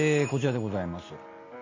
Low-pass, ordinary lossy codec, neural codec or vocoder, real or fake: 7.2 kHz; AAC, 32 kbps; none; real